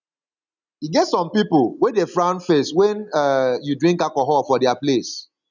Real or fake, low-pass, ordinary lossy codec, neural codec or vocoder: real; 7.2 kHz; none; none